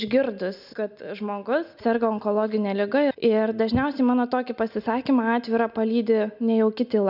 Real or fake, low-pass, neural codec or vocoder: real; 5.4 kHz; none